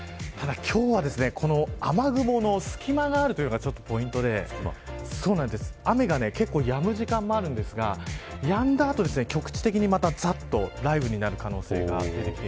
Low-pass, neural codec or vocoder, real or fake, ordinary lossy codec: none; none; real; none